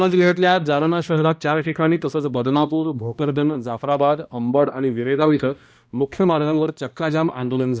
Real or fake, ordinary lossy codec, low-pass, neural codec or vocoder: fake; none; none; codec, 16 kHz, 1 kbps, X-Codec, HuBERT features, trained on balanced general audio